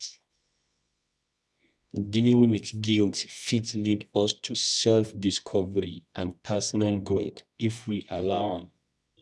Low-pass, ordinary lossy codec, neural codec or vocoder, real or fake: none; none; codec, 24 kHz, 0.9 kbps, WavTokenizer, medium music audio release; fake